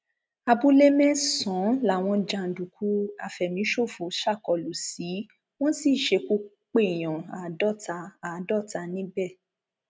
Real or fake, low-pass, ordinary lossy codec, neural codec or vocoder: real; none; none; none